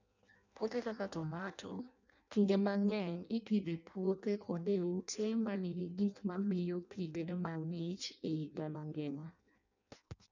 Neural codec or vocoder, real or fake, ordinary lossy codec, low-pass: codec, 16 kHz in and 24 kHz out, 0.6 kbps, FireRedTTS-2 codec; fake; none; 7.2 kHz